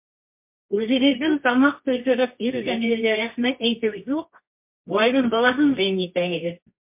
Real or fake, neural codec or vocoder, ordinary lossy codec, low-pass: fake; codec, 24 kHz, 0.9 kbps, WavTokenizer, medium music audio release; MP3, 32 kbps; 3.6 kHz